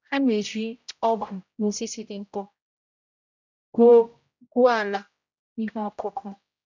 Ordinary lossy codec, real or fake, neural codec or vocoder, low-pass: none; fake; codec, 16 kHz, 0.5 kbps, X-Codec, HuBERT features, trained on general audio; 7.2 kHz